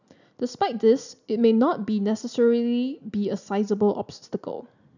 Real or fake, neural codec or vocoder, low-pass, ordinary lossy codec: real; none; 7.2 kHz; none